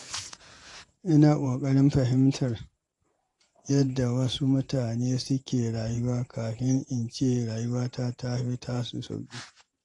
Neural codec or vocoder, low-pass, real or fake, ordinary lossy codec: vocoder, 48 kHz, 128 mel bands, Vocos; 10.8 kHz; fake; AAC, 48 kbps